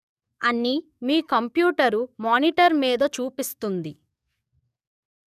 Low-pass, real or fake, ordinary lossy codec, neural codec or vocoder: 14.4 kHz; fake; none; codec, 44.1 kHz, 7.8 kbps, DAC